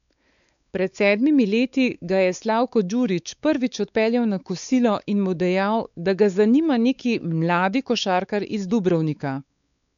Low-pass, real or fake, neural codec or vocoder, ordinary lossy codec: 7.2 kHz; fake; codec, 16 kHz, 4 kbps, X-Codec, WavLM features, trained on Multilingual LibriSpeech; none